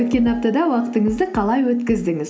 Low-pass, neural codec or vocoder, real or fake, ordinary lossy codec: none; none; real; none